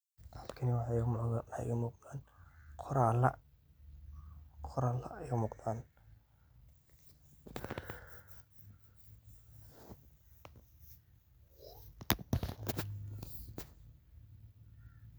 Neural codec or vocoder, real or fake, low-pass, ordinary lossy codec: none; real; none; none